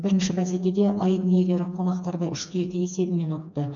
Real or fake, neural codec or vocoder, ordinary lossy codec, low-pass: fake; codec, 16 kHz, 2 kbps, FreqCodec, smaller model; none; 7.2 kHz